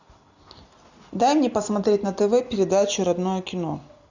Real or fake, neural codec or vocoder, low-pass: real; none; 7.2 kHz